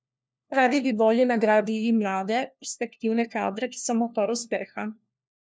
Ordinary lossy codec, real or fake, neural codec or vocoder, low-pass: none; fake; codec, 16 kHz, 1 kbps, FunCodec, trained on LibriTTS, 50 frames a second; none